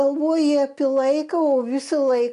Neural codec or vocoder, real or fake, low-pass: none; real; 10.8 kHz